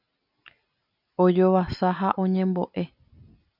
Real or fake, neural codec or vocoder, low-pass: real; none; 5.4 kHz